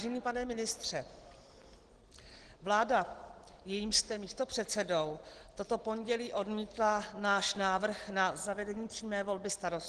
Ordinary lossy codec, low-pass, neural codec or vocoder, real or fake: Opus, 16 kbps; 9.9 kHz; none; real